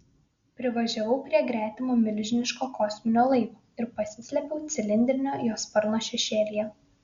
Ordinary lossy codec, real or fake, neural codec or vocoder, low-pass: Opus, 64 kbps; real; none; 7.2 kHz